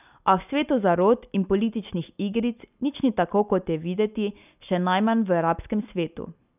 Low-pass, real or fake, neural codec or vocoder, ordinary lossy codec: 3.6 kHz; real; none; none